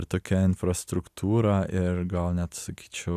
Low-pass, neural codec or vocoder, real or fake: 14.4 kHz; none; real